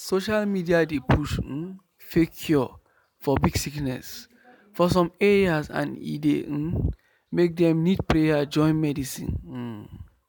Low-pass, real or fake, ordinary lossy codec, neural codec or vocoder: none; real; none; none